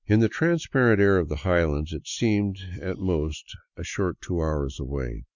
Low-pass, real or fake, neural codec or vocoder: 7.2 kHz; real; none